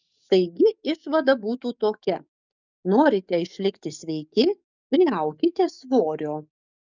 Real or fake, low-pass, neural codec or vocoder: fake; 7.2 kHz; codec, 44.1 kHz, 7.8 kbps, DAC